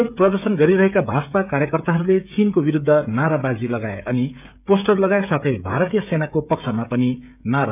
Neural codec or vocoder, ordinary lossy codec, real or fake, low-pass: codec, 16 kHz, 16 kbps, FreqCodec, smaller model; none; fake; 3.6 kHz